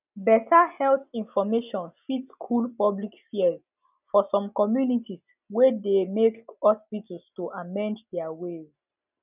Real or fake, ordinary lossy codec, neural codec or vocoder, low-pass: real; none; none; 3.6 kHz